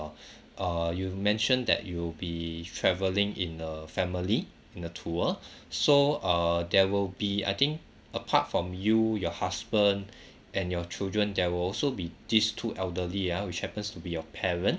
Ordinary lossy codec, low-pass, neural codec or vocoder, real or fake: none; none; none; real